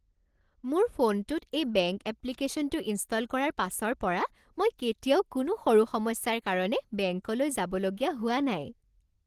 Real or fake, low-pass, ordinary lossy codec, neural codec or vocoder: real; 14.4 kHz; Opus, 16 kbps; none